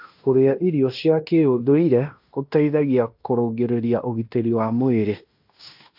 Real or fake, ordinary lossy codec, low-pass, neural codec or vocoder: fake; AAC, 48 kbps; 5.4 kHz; codec, 16 kHz in and 24 kHz out, 0.9 kbps, LongCat-Audio-Codec, fine tuned four codebook decoder